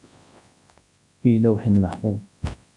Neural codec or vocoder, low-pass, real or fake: codec, 24 kHz, 0.9 kbps, WavTokenizer, large speech release; 10.8 kHz; fake